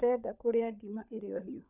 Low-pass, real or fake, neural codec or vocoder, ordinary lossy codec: 3.6 kHz; fake; codec, 16 kHz, 16 kbps, FunCodec, trained on LibriTTS, 50 frames a second; none